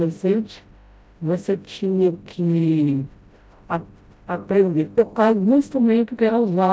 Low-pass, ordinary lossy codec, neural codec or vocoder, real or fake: none; none; codec, 16 kHz, 0.5 kbps, FreqCodec, smaller model; fake